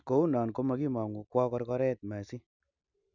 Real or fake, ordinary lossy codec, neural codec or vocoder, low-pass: real; none; none; 7.2 kHz